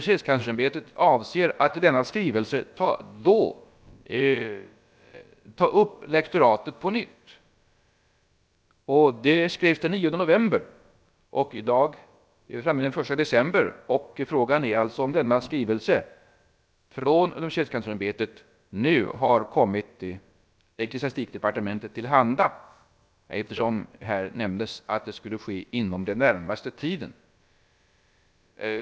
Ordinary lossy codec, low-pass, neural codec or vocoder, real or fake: none; none; codec, 16 kHz, about 1 kbps, DyCAST, with the encoder's durations; fake